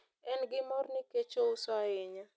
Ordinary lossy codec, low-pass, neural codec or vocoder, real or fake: none; none; none; real